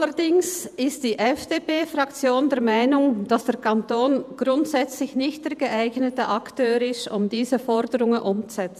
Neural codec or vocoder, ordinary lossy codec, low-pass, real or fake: vocoder, 48 kHz, 128 mel bands, Vocos; none; 14.4 kHz; fake